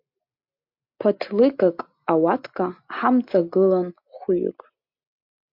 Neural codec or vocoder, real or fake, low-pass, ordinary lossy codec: none; real; 5.4 kHz; MP3, 48 kbps